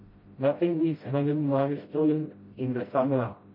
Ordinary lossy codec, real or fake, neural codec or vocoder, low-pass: MP3, 24 kbps; fake; codec, 16 kHz, 0.5 kbps, FreqCodec, smaller model; 5.4 kHz